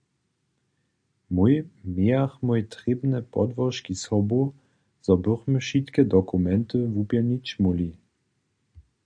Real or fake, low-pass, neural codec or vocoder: real; 9.9 kHz; none